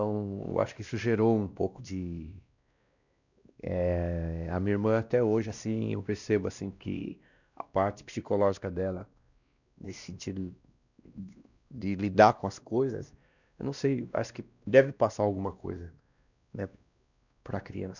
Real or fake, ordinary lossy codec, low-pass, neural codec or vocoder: fake; none; 7.2 kHz; codec, 16 kHz, 1 kbps, X-Codec, WavLM features, trained on Multilingual LibriSpeech